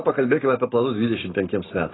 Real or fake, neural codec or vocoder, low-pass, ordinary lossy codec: real; none; 7.2 kHz; AAC, 16 kbps